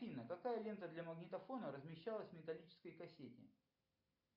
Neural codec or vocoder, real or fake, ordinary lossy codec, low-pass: none; real; MP3, 48 kbps; 5.4 kHz